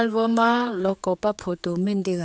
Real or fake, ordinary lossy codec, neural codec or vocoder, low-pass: fake; none; codec, 16 kHz, 4 kbps, X-Codec, HuBERT features, trained on general audio; none